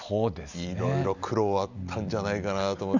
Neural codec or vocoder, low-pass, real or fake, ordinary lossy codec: none; 7.2 kHz; real; none